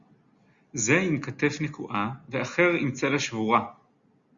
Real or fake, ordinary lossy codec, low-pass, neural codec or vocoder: real; Opus, 64 kbps; 7.2 kHz; none